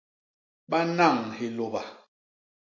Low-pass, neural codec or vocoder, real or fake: 7.2 kHz; none; real